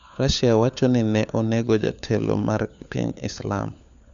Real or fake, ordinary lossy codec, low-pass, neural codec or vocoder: fake; Opus, 64 kbps; 7.2 kHz; codec, 16 kHz, 4 kbps, FunCodec, trained on LibriTTS, 50 frames a second